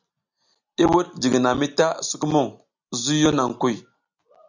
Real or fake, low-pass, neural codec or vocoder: real; 7.2 kHz; none